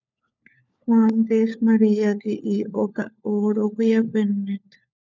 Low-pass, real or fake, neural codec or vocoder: 7.2 kHz; fake; codec, 16 kHz, 4 kbps, FunCodec, trained on LibriTTS, 50 frames a second